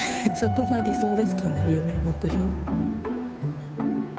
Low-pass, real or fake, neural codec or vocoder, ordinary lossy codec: none; fake; codec, 16 kHz, 2 kbps, FunCodec, trained on Chinese and English, 25 frames a second; none